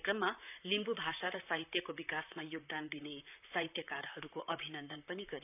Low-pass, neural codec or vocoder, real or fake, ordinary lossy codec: 3.6 kHz; vocoder, 44.1 kHz, 128 mel bands, Pupu-Vocoder; fake; none